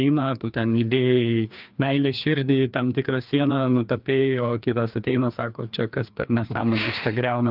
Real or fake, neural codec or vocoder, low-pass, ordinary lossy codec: fake; codec, 16 kHz, 2 kbps, FreqCodec, larger model; 5.4 kHz; Opus, 32 kbps